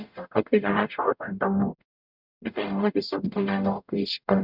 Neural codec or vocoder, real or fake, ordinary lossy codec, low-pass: codec, 44.1 kHz, 0.9 kbps, DAC; fake; none; 5.4 kHz